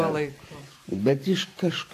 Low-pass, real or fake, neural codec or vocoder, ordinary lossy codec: 14.4 kHz; fake; vocoder, 44.1 kHz, 128 mel bands every 512 samples, BigVGAN v2; AAC, 64 kbps